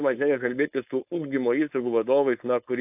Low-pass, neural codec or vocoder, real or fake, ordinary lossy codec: 3.6 kHz; codec, 16 kHz, 4.8 kbps, FACodec; fake; AAC, 32 kbps